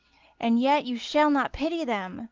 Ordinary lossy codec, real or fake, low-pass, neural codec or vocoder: Opus, 16 kbps; real; 7.2 kHz; none